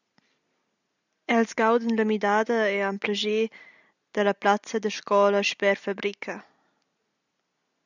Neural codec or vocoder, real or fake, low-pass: none; real; 7.2 kHz